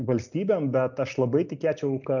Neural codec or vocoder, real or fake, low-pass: none; real; 7.2 kHz